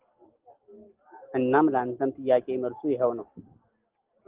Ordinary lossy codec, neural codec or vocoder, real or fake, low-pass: Opus, 16 kbps; none; real; 3.6 kHz